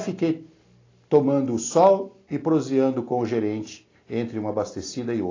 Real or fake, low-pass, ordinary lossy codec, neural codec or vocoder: real; 7.2 kHz; AAC, 32 kbps; none